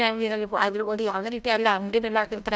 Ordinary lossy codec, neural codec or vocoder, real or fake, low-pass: none; codec, 16 kHz, 0.5 kbps, FreqCodec, larger model; fake; none